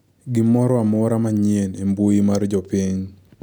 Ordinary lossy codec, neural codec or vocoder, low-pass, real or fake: none; none; none; real